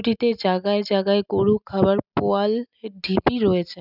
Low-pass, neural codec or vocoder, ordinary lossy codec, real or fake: 5.4 kHz; none; none; real